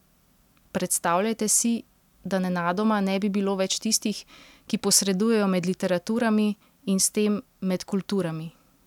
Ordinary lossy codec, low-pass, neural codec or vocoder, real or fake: none; 19.8 kHz; none; real